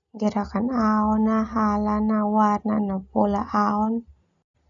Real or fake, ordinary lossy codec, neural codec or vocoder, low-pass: real; none; none; 7.2 kHz